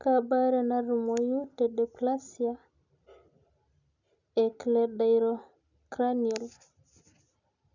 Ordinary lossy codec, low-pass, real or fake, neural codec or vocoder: none; 7.2 kHz; real; none